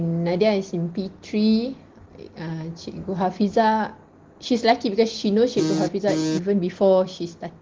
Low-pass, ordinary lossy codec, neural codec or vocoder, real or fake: 7.2 kHz; Opus, 16 kbps; none; real